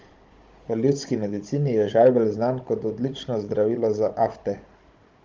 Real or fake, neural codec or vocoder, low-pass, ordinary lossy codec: fake; codec, 16 kHz, 16 kbps, FunCodec, trained on Chinese and English, 50 frames a second; 7.2 kHz; Opus, 32 kbps